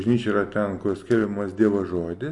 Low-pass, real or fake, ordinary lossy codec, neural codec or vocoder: 10.8 kHz; real; MP3, 48 kbps; none